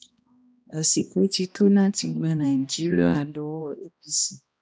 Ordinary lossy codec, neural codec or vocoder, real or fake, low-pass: none; codec, 16 kHz, 1 kbps, X-Codec, HuBERT features, trained on balanced general audio; fake; none